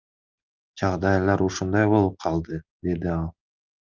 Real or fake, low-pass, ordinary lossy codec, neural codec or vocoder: real; 7.2 kHz; Opus, 32 kbps; none